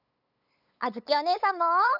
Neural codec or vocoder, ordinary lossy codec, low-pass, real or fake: codec, 16 kHz, 8 kbps, FunCodec, trained on LibriTTS, 25 frames a second; none; 5.4 kHz; fake